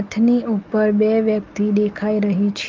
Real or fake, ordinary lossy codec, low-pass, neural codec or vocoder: real; Opus, 24 kbps; 7.2 kHz; none